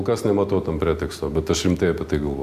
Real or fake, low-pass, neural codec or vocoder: real; 14.4 kHz; none